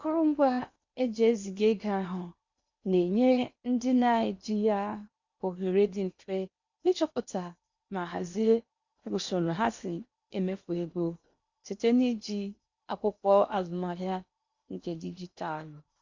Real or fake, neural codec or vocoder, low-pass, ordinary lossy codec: fake; codec, 16 kHz in and 24 kHz out, 0.8 kbps, FocalCodec, streaming, 65536 codes; 7.2 kHz; Opus, 64 kbps